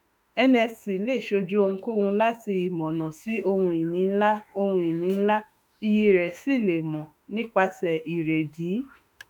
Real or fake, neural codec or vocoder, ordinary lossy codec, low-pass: fake; autoencoder, 48 kHz, 32 numbers a frame, DAC-VAE, trained on Japanese speech; none; none